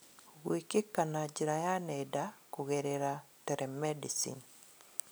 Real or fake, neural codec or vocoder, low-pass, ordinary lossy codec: real; none; none; none